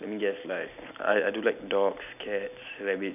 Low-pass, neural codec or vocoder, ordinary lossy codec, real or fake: 3.6 kHz; none; none; real